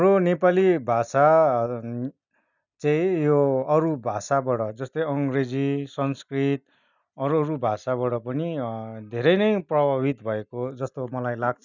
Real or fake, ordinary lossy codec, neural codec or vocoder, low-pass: real; none; none; 7.2 kHz